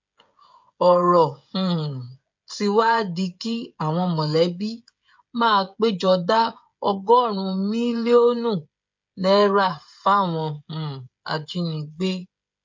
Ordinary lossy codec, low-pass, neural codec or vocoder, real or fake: MP3, 48 kbps; 7.2 kHz; codec, 16 kHz, 16 kbps, FreqCodec, smaller model; fake